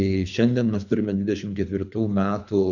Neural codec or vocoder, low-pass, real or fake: codec, 24 kHz, 3 kbps, HILCodec; 7.2 kHz; fake